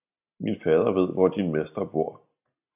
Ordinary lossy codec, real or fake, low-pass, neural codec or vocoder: AAC, 32 kbps; real; 3.6 kHz; none